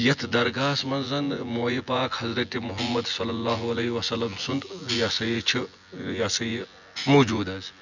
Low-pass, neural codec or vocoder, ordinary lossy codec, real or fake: 7.2 kHz; vocoder, 24 kHz, 100 mel bands, Vocos; none; fake